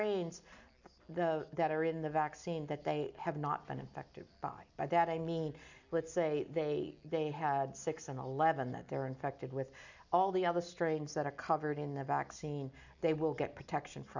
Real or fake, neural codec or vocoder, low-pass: real; none; 7.2 kHz